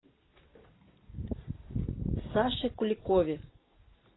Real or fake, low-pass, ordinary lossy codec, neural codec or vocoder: real; 7.2 kHz; AAC, 16 kbps; none